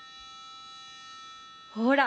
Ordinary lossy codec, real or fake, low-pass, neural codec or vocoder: none; real; none; none